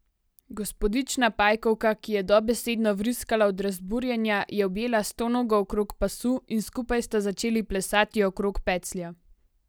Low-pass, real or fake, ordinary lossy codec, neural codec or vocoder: none; real; none; none